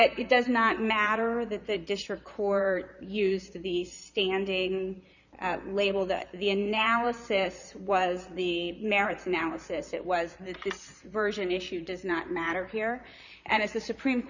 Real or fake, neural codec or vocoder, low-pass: fake; vocoder, 44.1 kHz, 128 mel bands, Pupu-Vocoder; 7.2 kHz